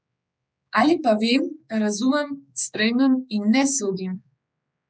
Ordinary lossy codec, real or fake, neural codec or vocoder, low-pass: none; fake; codec, 16 kHz, 4 kbps, X-Codec, HuBERT features, trained on general audio; none